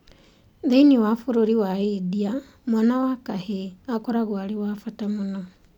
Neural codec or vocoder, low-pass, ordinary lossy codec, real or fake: none; 19.8 kHz; none; real